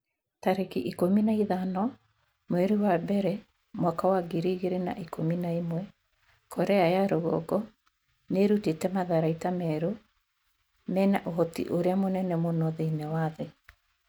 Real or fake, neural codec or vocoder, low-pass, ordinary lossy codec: real; none; none; none